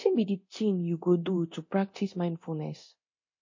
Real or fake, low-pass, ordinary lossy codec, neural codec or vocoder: fake; 7.2 kHz; MP3, 32 kbps; codec, 24 kHz, 0.9 kbps, DualCodec